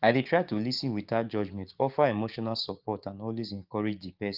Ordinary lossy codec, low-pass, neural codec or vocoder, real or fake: Opus, 32 kbps; 5.4 kHz; codec, 16 kHz, 6 kbps, DAC; fake